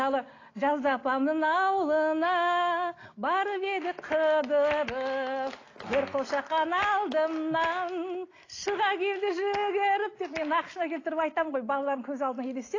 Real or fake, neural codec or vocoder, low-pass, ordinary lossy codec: real; none; 7.2 kHz; AAC, 32 kbps